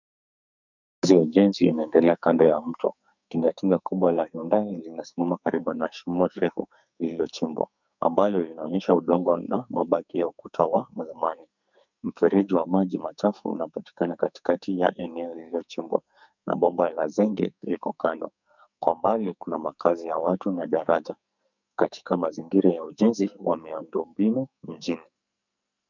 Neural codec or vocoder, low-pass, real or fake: codec, 44.1 kHz, 2.6 kbps, SNAC; 7.2 kHz; fake